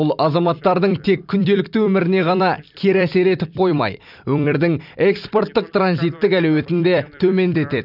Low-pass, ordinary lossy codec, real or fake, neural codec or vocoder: 5.4 kHz; none; fake; vocoder, 44.1 kHz, 128 mel bands every 256 samples, BigVGAN v2